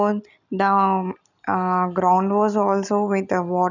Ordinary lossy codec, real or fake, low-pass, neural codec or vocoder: none; real; 7.2 kHz; none